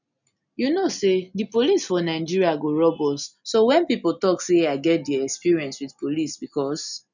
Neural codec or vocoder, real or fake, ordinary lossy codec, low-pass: none; real; none; 7.2 kHz